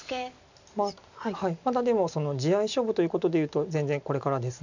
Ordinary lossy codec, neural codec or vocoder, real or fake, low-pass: none; none; real; 7.2 kHz